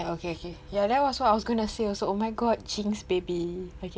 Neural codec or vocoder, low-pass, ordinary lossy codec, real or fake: none; none; none; real